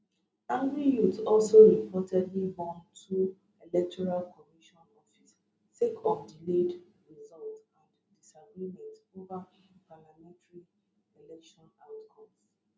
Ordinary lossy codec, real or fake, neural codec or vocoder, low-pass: none; real; none; none